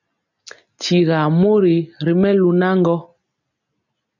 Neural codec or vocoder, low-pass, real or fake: none; 7.2 kHz; real